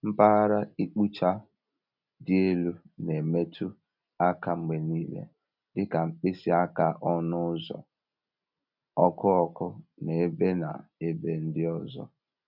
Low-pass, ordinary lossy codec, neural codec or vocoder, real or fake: 5.4 kHz; none; none; real